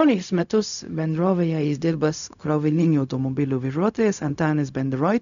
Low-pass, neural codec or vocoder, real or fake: 7.2 kHz; codec, 16 kHz, 0.4 kbps, LongCat-Audio-Codec; fake